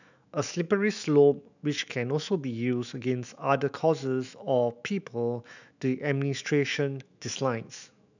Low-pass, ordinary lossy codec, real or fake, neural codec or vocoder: 7.2 kHz; none; fake; autoencoder, 48 kHz, 128 numbers a frame, DAC-VAE, trained on Japanese speech